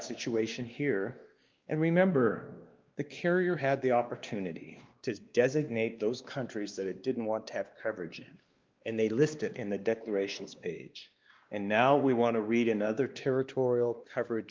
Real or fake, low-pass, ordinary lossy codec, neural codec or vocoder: fake; 7.2 kHz; Opus, 32 kbps; codec, 16 kHz, 2 kbps, X-Codec, WavLM features, trained on Multilingual LibriSpeech